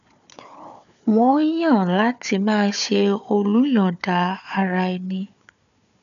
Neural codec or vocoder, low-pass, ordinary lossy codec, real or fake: codec, 16 kHz, 4 kbps, FunCodec, trained on Chinese and English, 50 frames a second; 7.2 kHz; none; fake